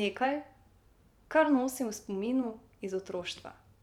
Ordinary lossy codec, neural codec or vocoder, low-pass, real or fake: none; vocoder, 44.1 kHz, 128 mel bands every 512 samples, BigVGAN v2; 19.8 kHz; fake